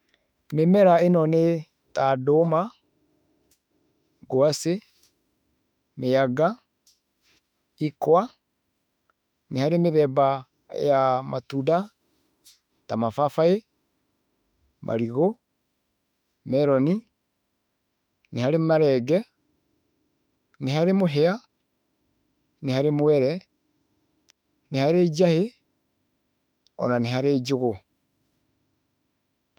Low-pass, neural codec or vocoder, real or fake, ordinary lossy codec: 19.8 kHz; autoencoder, 48 kHz, 32 numbers a frame, DAC-VAE, trained on Japanese speech; fake; none